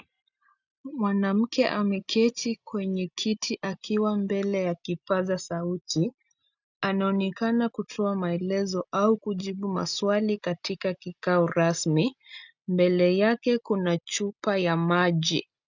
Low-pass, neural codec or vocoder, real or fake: 7.2 kHz; none; real